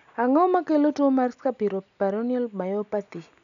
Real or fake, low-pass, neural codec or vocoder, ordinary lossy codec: real; 7.2 kHz; none; none